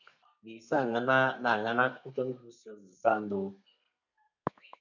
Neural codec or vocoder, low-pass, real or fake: codec, 32 kHz, 1.9 kbps, SNAC; 7.2 kHz; fake